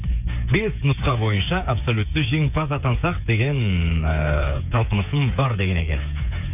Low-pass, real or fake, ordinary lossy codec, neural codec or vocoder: 3.6 kHz; fake; none; codec, 16 kHz, 8 kbps, FreqCodec, smaller model